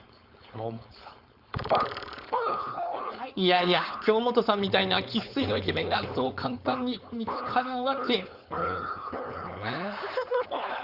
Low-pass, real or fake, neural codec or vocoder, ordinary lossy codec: 5.4 kHz; fake; codec, 16 kHz, 4.8 kbps, FACodec; Opus, 64 kbps